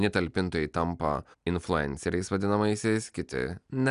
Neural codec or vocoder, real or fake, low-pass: none; real; 10.8 kHz